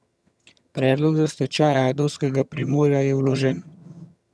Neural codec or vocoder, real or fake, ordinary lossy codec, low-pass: vocoder, 22.05 kHz, 80 mel bands, HiFi-GAN; fake; none; none